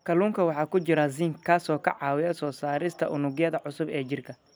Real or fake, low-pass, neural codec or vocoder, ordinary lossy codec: real; none; none; none